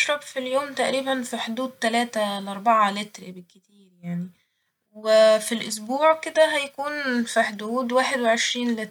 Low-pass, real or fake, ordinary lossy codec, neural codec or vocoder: 19.8 kHz; real; MP3, 96 kbps; none